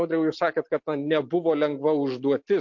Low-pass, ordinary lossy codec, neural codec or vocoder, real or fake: 7.2 kHz; MP3, 48 kbps; none; real